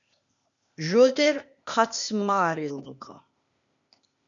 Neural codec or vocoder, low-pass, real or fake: codec, 16 kHz, 0.8 kbps, ZipCodec; 7.2 kHz; fake